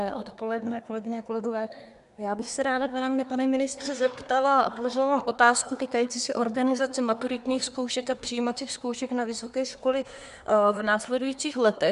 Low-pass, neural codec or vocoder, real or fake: 10.8 kHz; codec, 24 kHz, 1 kbps, SNAC; fake